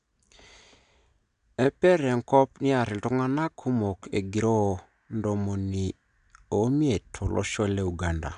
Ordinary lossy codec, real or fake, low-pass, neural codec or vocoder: none; real; 9.9 kHz; none